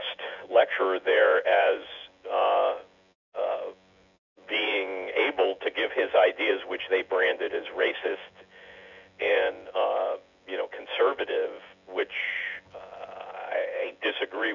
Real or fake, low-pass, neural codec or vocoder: fake; 7.2 kHz; vocoder, 24 kHz, 100 mel bands, Vocos